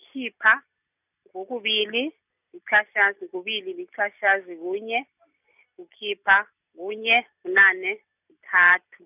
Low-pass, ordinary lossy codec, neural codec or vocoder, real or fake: 3.6 kHz; none; none; real